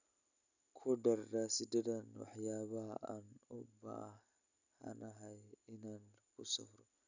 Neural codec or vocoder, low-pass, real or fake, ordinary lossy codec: none; 7.2 kHz; real; none